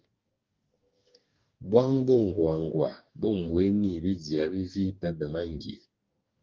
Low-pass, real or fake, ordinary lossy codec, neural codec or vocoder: 7.2 kHz; fake; Opus, 24 kbps; codec, 44.1 kHz, 2.6 kbps, DAC